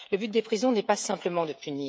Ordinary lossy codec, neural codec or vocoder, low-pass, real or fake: none; codec, 16 kHz, 16 kbps, FreqCodec, smaller model; none; fake